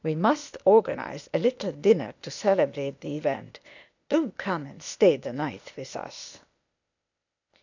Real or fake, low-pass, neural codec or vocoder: fake; 7.2 kHz; codec, 16 kHz, 0.8 kbps, ZipCodec